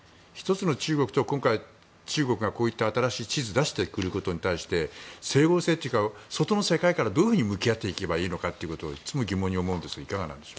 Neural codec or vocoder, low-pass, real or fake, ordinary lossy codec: none; none; real; none